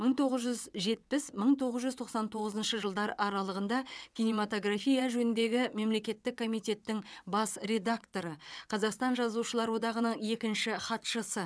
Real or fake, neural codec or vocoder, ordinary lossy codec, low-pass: fake; vocoder, 22.05 kHz, 80 mel bands, WaveNeXt; none; none